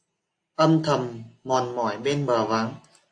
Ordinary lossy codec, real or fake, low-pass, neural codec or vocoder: AAC, 48 kbps; real; 9.9 kHz; none